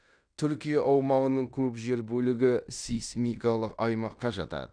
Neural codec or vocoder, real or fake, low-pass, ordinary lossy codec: codec, 16 kHz in and 24 kHz out, 0.9 kbps, LongCat-Audio-Codec, fine tuned four codebook decoder; fake; 9.9 kHz; none